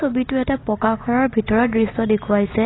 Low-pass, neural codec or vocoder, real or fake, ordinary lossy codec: 7.2 kHz; none; real; AAC, 16 kbps